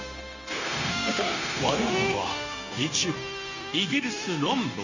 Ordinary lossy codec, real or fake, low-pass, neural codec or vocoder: none; fake; 7.2 kHz; codec, 16 kHz, 0.9 kbps, LongCat-Audio-Codec